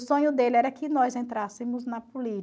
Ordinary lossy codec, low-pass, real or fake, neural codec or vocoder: none; none; real; none